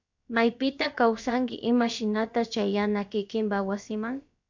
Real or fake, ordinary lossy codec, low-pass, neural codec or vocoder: fake; MP3, 64 kbps; 7.2 kHz; codec, 16 kHz, about 1 kbps, DyCAST, with the encoder's durations